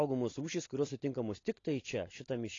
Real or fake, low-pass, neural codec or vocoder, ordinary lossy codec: real; 7.2 kHz; none; AAC, 32 kbps